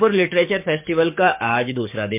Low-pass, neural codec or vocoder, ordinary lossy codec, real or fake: 3.6 kHz; codec, 44.1 kHz, 7.8 kbps, DAC; MP3, 32 kbps; fake